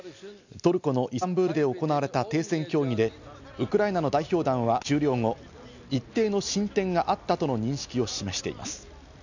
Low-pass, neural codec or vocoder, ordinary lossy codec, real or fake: 7.2 kHz; none; none; real